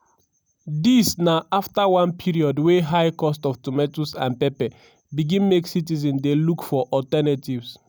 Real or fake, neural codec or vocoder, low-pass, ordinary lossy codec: real; none; none; none